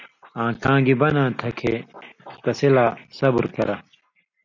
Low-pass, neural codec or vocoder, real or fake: 7.2 kHz; none; real